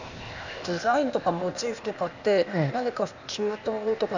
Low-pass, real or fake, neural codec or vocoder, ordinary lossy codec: 7.2 kHz; fake; codec, 16 kHz, 0.8 kbps, ZipCodec; none